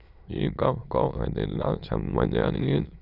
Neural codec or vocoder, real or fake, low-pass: autoencoder, 22.05 kHz, a latent of 192 numbers a frame, VITS, trained on many speakers; fake; 5.4 kHz